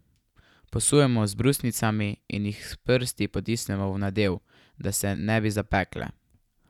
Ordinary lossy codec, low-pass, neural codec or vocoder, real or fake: none; 19.8 kHz; none; real